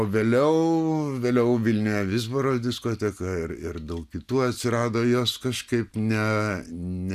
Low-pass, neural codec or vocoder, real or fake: 14.4 kHz; none; real